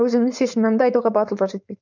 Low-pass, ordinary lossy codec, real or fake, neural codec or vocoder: 7.2 kHz; none; fake; codec, 16 kHz, 4.8 kbps, FACodec